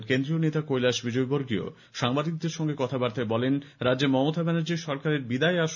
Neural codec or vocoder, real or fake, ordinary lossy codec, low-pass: none; real; none; 7.2 kHz